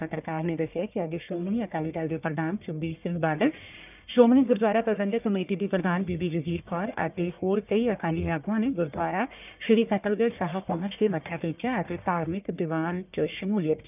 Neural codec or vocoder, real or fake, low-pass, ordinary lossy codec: codec, 44.1 kHz, 1.7 kbps, Pupu-Codec; fake; 3.6 kHz; none